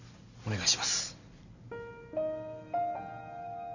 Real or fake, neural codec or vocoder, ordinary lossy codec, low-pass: real; none; none; 7.2 kHz